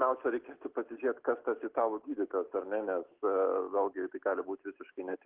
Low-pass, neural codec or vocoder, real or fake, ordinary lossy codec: 3.6 kHz; none; real; Opus, 16 kbps